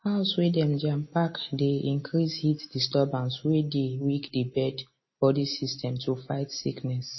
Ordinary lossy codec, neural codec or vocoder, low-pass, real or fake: MP3, 24 kbps; none; 7.2 kHz; real